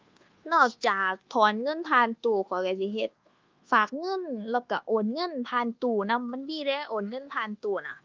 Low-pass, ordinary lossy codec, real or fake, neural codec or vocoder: 7.2 kHz; Opus, 32 kbps; fake; codec, 24 kHz, 1.2 kbps, DualCodec